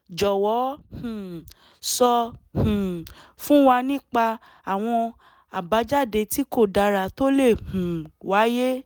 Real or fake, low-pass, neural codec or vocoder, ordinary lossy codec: real; none; none; none